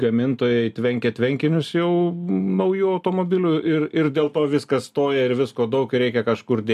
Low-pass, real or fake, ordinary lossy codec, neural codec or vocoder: 14.4 kHz; real; MP3, 96 kbps; none